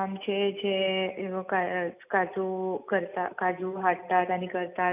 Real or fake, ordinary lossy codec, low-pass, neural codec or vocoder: real; none; 3.6 kHz; none